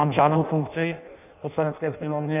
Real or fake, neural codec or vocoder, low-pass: fake; codec, 16 kHz in and 24 kHz out, 0.6 kbps, FireRedTTS-2 codec; 3.6 kHz